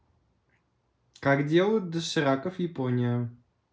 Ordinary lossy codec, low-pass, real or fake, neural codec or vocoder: none; none; real; none